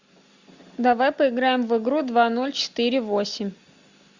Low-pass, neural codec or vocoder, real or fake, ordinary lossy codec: 7.2 kHz; none; real; Opus, 64 kbps